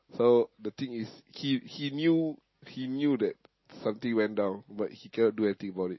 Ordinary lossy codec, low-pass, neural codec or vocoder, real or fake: MP3, 24 kbps; 7.2 kHz; none; real